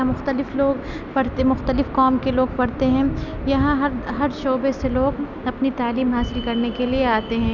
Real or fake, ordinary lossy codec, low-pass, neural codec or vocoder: real; none; 7.2 kHz; none